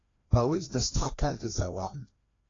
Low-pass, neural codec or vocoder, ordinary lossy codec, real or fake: 7.2 kHz; codec, 16 kHz, 1 kbps, FreqCodec, larger model; AAC, 32 kbps; fake